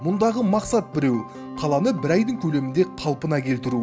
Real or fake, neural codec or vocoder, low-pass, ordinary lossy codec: real; none; none; none